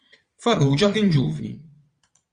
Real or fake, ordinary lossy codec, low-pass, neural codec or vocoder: fake; Opus, 64 kbps; 9.9 kHz; vocoder, 22.05 kHz, 80 mel bands, Vocos